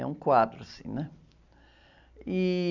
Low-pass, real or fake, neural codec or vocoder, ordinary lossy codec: 7.2 kHz; real; none; none